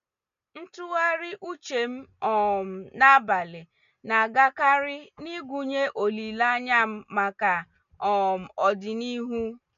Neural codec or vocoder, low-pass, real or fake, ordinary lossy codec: none; 7.2 kHz; real; none